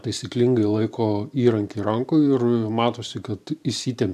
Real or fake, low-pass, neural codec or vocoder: fake; 14.4 kHz; vocoder, 44.1 kHz, 128 mel bands every 512 samples, BigVGAN v2